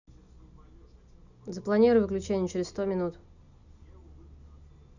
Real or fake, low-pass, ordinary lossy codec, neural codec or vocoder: real; 7.2 kHz; none; none